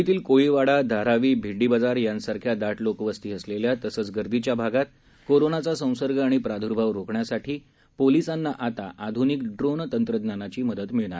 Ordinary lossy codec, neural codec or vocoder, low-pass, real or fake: none; none; none; real